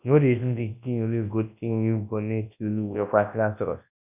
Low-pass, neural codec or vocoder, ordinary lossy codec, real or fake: 3.6 kHz; codec, 24 kHz, 0.9 kbps, WavTokenizer, large speech release; none; fake